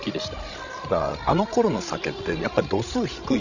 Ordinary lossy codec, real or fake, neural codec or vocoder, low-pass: none; fake; codec, 16 kHz, 16 kbps, FreqCodec, larger model; 7.2 kHz